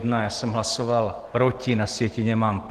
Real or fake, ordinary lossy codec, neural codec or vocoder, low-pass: real; Opus, 16 kbps; none; 14.4 kHz